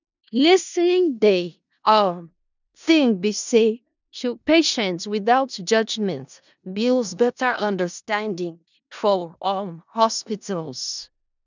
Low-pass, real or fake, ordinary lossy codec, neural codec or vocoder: 7.2 kHz; fake; none; codec, 16 kHz in and 24 kHz out, 0.4 kbps, LongCat-Audio-Codec, four codebook decoder